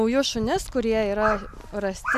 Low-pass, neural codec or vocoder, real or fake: 14.4 kHz; none; real